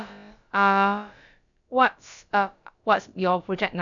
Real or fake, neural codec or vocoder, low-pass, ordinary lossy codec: fake; codec, 16 kHz, about 1 kbps, DyCAST, with the encoder's durations; 7.2 kHz; none